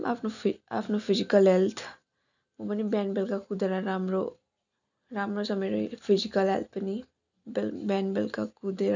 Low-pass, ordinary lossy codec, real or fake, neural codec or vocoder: 7.2 kHz; none; real; none